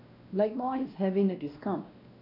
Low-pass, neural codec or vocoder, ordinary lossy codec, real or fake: 5.4 kHz; codec, 16 kHz, 1 kbps, X-Codec, WavLM features, trained on Multilingual LibriSpeech; none; fake